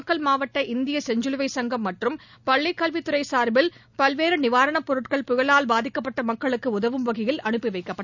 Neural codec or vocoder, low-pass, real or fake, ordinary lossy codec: none; 7.2 kHz; real; none